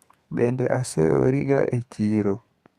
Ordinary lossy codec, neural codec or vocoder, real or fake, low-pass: Opus, 64 kbps; codec, 32 kHz, 1.9 kbps, SNAC; fake; 14.4 kHz